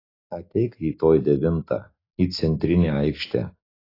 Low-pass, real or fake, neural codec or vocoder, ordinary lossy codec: 5.4 kHz; real; none; AAC, 24 kbps